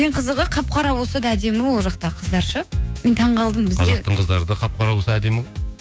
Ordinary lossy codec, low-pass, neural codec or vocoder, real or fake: none; none; codec, 16 kHz, 6 kbps, DAC; fake